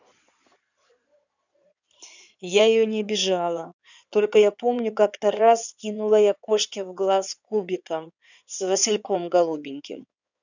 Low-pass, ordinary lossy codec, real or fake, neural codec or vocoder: 7.2 kHz; none; fake; codec, 16 kHz in and 24 kHz out, 2.2 kbps, FireRedTTS-2 codec